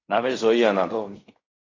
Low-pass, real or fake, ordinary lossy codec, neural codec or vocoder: 7.2 kHz; fake; AAC, 32 kbps; codec, 16 kHz in and 24 kHz out, 0.4 kbps, LongCat-Audio-Codec, fine tuned four codebook decoder